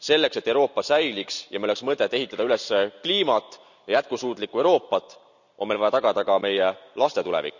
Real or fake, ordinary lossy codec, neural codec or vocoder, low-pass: real; none; none; 7.2 kHz